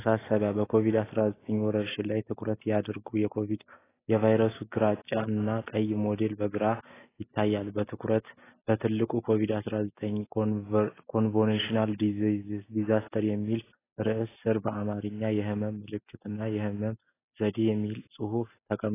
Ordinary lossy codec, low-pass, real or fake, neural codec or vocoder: AAC, 16 kbps; 3.6 kHz; real; none